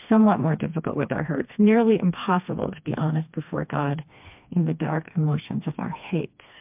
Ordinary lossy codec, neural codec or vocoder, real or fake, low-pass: MP3, 32 kbps; codec, 16 kHz, 2 kbps, FreqCodec, smaller model; fake; 3.6 kHz